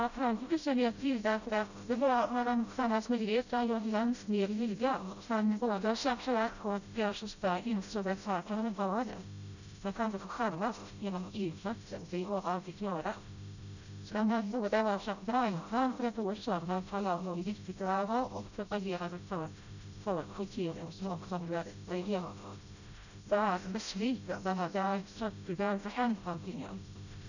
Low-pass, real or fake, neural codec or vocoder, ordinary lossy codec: 7.2 kHz; fake; codec, 16 kHz, 0.5 kbps, FreqCodec, smaller model; none